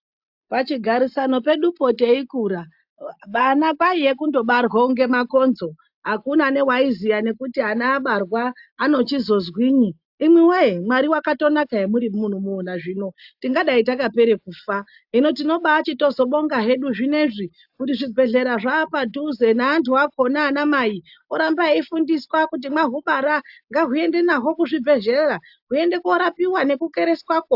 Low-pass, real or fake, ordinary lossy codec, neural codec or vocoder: 5.4 kHz; real; AAC, 48 kbps; none